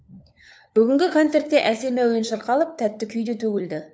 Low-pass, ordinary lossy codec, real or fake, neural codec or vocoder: none; none; fake; codec, 16 kHz, 4 kbps, FunCodec, trained on LibriTTS, 50 frames a second